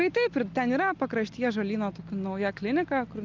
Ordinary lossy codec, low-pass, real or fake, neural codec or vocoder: Opus, 24 kbps; 7.2 kHz; real; none